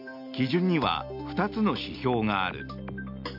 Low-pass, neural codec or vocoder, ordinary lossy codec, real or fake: 5.4 kHz; none; AAC, 48 kbps; real